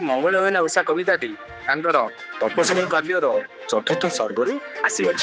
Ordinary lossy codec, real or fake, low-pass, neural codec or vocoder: none; fake; none; codec, 16 kHz, 2 kbps, X-Codec, HuBERT features, trained on general audio